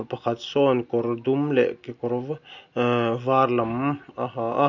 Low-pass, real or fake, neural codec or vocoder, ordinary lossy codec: 7.2 kHz; real; none; none